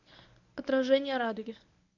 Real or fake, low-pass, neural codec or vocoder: fake; 7.2 kHz; codec, 24 kHz, 0.9 kbps, WavTokenizer, medium speech release version 1